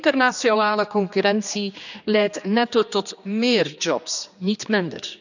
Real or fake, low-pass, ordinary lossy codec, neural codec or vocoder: fake; 7.2 kHz; none; codec, 16 kHz, 2 kbps, X-Codec, HuBERT features, trained on general audio